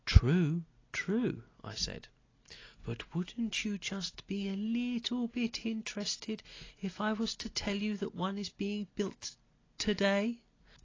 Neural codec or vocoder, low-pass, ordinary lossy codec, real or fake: none; 7.2 kHz; AAC, 32 kbps; real